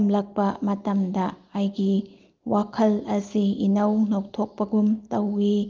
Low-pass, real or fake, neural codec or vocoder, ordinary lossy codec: 7.2 kHz; real; none; Opus, 32 kbps